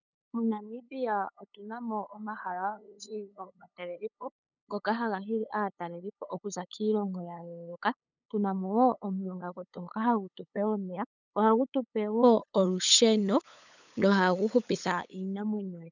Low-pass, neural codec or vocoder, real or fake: 7.2 kHz; codec, 16 kHz, 8 kbps, FunCodec, trained on LibriTTS, 25 frames a second; fake